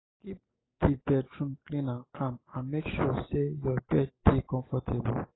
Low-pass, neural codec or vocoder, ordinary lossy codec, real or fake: 7.2 kHz; none; AAC, 16 kbps; real